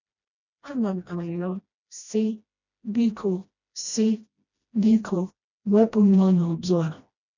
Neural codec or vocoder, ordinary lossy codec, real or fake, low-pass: codec, 16 kHz, 1 kbps, FreqCodec, smaller model; none; fake; 7.2 kHz